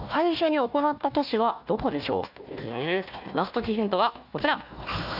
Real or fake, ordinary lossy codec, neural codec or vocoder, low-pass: fake; none; codec, 16 kHz, 1 kbps, FunCodec, trained on Chinese and English, 50 frames a second; 5.4 kHz